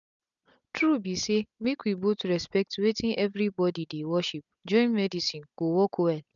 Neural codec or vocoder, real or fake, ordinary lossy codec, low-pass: none; real; none; 7.2 kHz